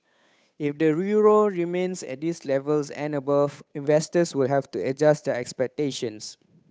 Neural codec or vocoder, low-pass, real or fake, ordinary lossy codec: codec, 16 kHz, 8 kbps, FunCodec, trained on Chinese and English, 25 frames a second; none; fake; none